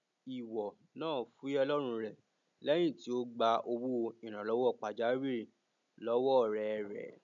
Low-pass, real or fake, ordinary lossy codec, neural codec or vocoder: 7.2 kHz; real; none; none